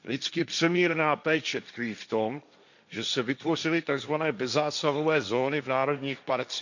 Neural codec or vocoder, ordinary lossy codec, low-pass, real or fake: codec, 16 kHz, 1.1 kbps, Voila-Tokenizer; none; 7.2 kHz; fake